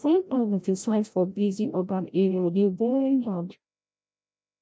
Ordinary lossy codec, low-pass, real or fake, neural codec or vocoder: none; none; fake; codec, 16 kHz, 0.5 kbps, FreqCodec, larger model